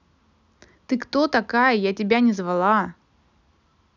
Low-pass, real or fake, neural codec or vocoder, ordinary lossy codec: 7.2 kHz; real; none; none